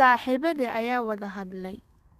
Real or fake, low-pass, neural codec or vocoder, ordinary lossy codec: fake; 14.4 kHz; codec, 32 kHz, 1.9 kbps, SNAC; none